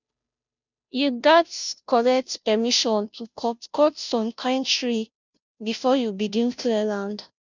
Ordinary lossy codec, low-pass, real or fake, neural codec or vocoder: none; 7.2 kHz; fake; codec, 16 kHz, 0.5 kbps, FunCodec, trained on Chinese and English, 25 frames a second